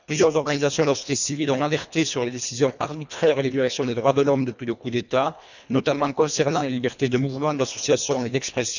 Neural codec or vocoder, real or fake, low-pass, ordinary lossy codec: codec, 24 kHz, 1.5 kbps, HILCodec; fake; 7.2 kHz; none